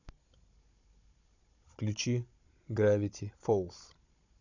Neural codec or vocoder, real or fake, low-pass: codec, 16 kHz, 16 kbps, FunCodec, trained on Chinese and English, 50 frames a second; fake; 7.2 kHz